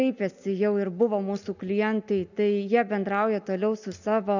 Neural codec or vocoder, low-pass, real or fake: none; 7.2 kHz; real